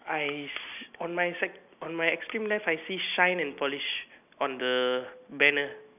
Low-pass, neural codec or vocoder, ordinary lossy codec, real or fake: 3.6 kHz; none; none; real